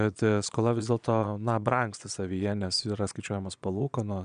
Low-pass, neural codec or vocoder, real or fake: 9.9 kHz; vocoder, 22.05 kHz, 80 mel bands, Vocos; fake